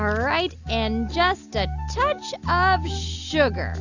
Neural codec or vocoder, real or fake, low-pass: none; real; 7.2 kHz